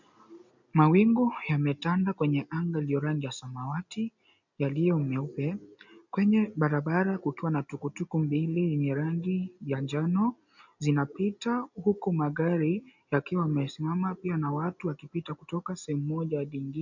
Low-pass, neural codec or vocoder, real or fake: 7.2 kHz; none; real